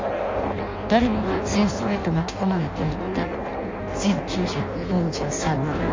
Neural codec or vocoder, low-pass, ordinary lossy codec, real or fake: codec, 16 kHz in and 24 kHz out, 0.6 kbps, FireRedTTS-2 codec; 7.2 kHz; MP3, 48 kbps; fake